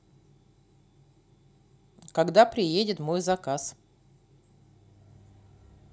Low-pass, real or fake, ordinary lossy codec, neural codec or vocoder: none; real; none; none